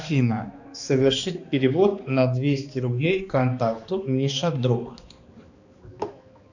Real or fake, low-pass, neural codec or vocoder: fake; 7.2 kHz; codec, 16 kHz, 2 kbps, X-Codec, HuBERT features, trained on balanced general audio